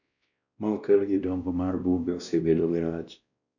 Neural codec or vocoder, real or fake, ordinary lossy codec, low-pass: codec, 16 kHz, 1 kbps, X-Codec, WavLM features, trained on Multilingual LibriSpeech; fake; none; 7.2 kHz